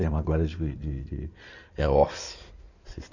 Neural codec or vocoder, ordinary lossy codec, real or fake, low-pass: codec, 16 kHz in and 24 kHz out, 2.2 kbps, FireRedTTS-2 codec; none; fake; 7.2 kHz